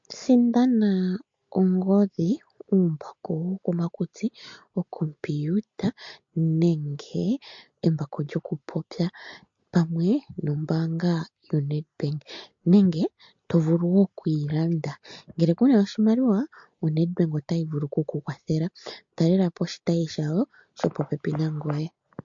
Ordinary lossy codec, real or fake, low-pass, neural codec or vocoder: MP3, 48 kbps; real; 7.2 kHz; none